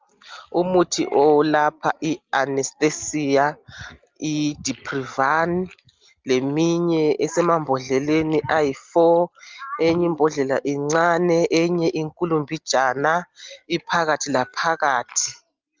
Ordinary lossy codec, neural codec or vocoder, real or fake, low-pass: Opus, 32 kbps; none; real; 7.2 kHz